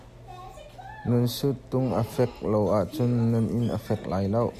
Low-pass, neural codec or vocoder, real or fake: 14.4 kHz; none; real